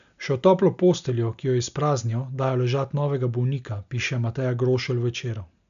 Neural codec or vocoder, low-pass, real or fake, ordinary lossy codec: none; 7.2 kHz; real; none